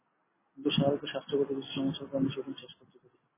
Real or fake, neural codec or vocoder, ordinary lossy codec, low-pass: real; none; MP3, 16 kbps; 3.6 kHz